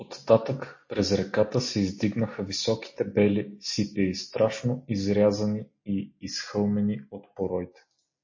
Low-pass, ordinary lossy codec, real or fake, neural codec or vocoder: 7.2 kHz; MP3, 32 kbps; real; none